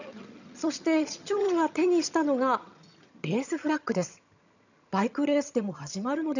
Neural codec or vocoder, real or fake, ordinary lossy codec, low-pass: vocoder, 22.05 kHz, 80 mel bands, HiFi-GAN; fake; none; 7.2 kHz